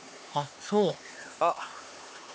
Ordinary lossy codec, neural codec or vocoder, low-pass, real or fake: none; codec, 16 kHz, 4 kbps, X-Codec, HuBERT features, trained on LibriSpeech; none; fake